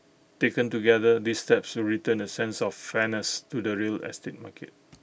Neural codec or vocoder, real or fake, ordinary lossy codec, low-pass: none; real; none; none